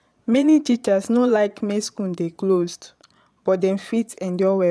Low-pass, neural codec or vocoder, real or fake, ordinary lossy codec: none; vocoder, 22.05 kHz, 80 mel bands, Vocos; fake; none